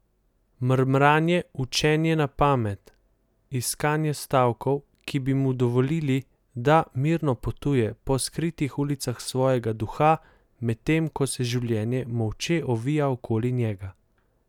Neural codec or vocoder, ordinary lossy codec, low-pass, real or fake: none; none; 19.8 kHz; real